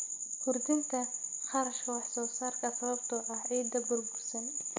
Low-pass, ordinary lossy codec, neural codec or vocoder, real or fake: 7.2 kHz; MP3, 64 kbps; none; real